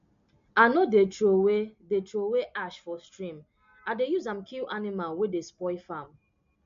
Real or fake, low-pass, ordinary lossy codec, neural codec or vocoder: real; 7.2 kHz; MP3, 48 kbps; none